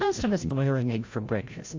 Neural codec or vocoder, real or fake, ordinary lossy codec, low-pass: codec, 16 kHz, 0.5 kbps, FreqCodec, larger model; fake; AAC, 48 kbps; 7.2 kHz